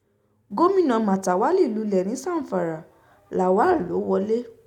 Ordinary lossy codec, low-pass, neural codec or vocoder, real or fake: none; 19.8 kHz; none; real